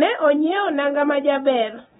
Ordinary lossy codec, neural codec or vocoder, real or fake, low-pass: AAC, 16 kbps; none; real; 10.8 kHz